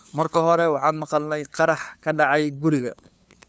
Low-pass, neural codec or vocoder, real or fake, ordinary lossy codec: none; codec, 16 kHz, 2 kbps, FunCodec, trained on LibriTTS, 25 frames a second; fake; none